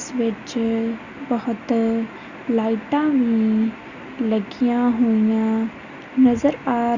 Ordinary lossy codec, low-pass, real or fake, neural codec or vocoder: Opus, 64 kbps; 7.2 kHz; real; none